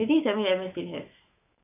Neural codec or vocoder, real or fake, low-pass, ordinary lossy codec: autoencoder, 48 kHz, 128 numbers a frame, DAC-VAE, trained on Japanese speech; fake; 3.6 kHz; none